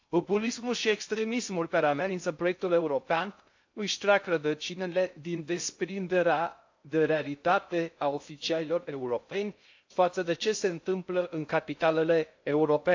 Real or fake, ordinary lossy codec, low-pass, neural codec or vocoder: fake; AAC, 48 kbps; 7.2 kHz; codec, 16 kHz in and 24 kHz out, 0.6 kbps, FocalCodec, streaming, 2048 codes